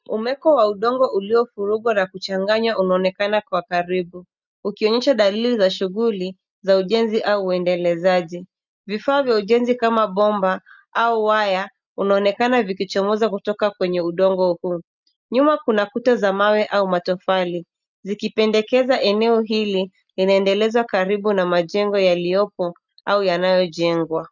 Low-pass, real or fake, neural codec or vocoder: 7.2 kHz; real; none